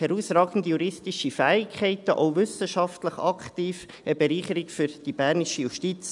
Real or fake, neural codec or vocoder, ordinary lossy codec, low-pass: real; none; none; 10.8 kHz